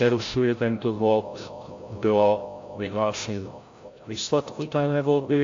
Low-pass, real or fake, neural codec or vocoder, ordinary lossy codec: 7.2 kHz; fake; codec, 16 kHz, 0.5 kbps, FreqCodec, larger model; AAC, 48 kbps